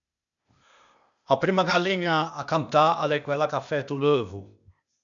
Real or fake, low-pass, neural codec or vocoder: fake; 7.2 kHz; codec, 16 kHz, 0.8 kbps, ZipCodec